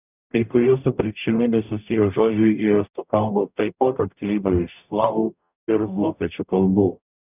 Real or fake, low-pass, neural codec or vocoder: fake; 3.6 kHz; codec, 44.1 kHz, 0.9 kbps, DAC